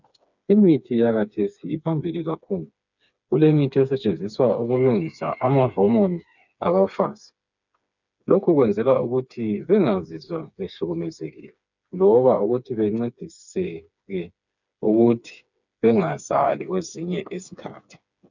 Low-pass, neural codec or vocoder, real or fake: 7.2 kHz; codec, 16 kHz, 4 kbps, FreqCodec, smaller model; fake